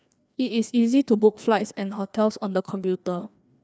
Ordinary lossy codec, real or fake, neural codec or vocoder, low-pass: none; fake; codec, 16 kHz, 2 kbps, FreqCodec, larger model; none